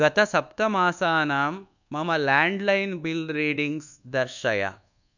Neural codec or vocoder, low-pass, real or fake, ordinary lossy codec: codec, 24 kHz, 1.2 kbps, DualCodec; 7.2 kHz; fake; none